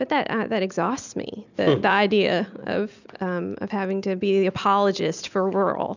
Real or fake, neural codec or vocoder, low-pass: real; none; 7.2 kHz